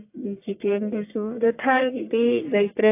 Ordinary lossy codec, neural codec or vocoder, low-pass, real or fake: AAC, 24 kbps; codec, 44.1 kHz, 1.7 kbps, Pupu-Codec; 3.6 kHz; fake